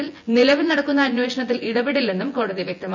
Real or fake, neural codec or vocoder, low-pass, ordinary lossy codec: fake; vocoder, 24 kHz, 100 mel bands, Vocos; 7.2 kHz; MP3, 64 kbps